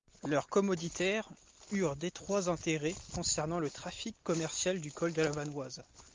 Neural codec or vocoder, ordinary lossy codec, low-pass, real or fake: none; Opus, 24 kbps; 7.2 kHz; real